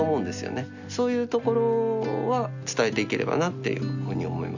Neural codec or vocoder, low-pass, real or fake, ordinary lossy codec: none; 7.2 kHz; real; none